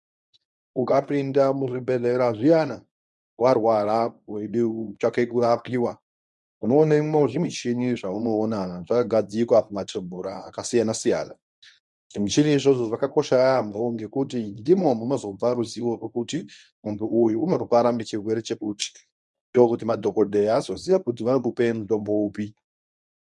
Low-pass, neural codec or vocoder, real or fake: 10.8 kHz; codec, 24 kHz, 0.9 kbps, WavTokenizer, medium speech release version 1; fake